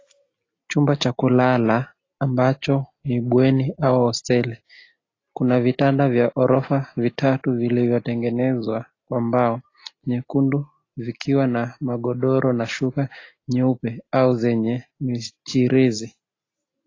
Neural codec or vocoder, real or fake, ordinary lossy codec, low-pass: none; real; AAC, 32 kbps; 7.2 kHz